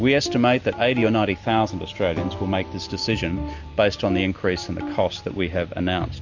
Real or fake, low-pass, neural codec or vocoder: real; 7.2 kHz; none